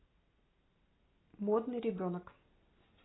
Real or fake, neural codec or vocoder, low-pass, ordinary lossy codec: real; none; 7.2 kHz; AAC, 16 kbps